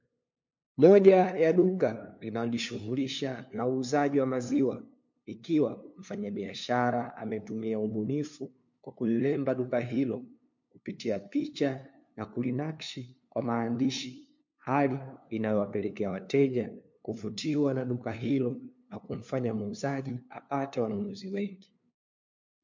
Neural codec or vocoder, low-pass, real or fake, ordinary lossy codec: codec, 16 kHz, 2 kbps, FunCodec, trained on LibriTTS, 25 frames a second; 7.2 kHz; fake; MP3, 48 kbps